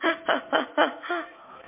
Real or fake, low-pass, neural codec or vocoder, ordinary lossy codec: fake; 3.6 kHz; autoencoder, 48 kHz, 128 numbers a frame, DAC-VAE, trained on Japanese speech; MP3, 24 kbps